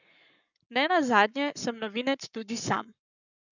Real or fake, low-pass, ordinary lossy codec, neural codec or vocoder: fake; 7.2 kHz; none; codec, 44.1 kHz, 7.8 kbps, Pupu-Codec